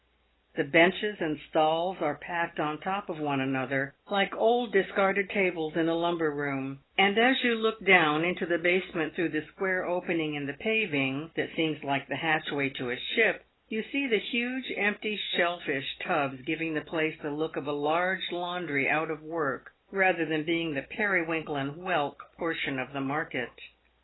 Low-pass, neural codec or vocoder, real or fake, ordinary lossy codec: 7.2 kHz; none; real; AAC, 16 kbps